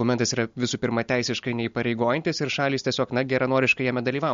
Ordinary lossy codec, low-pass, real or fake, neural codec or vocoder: MP3, 48 kbps; 7.2 kHz; real; none